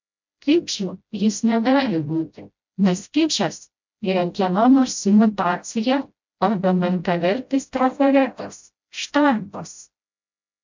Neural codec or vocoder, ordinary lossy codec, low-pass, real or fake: codec, 16 kHz, 0.5 kbps, FreqCodec, smaller model; MP3, 64 kbps; 7.2 kHz; fake